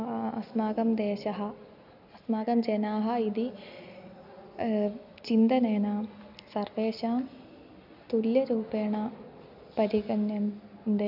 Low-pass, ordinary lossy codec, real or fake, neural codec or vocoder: 5.4 kHz; none; real; none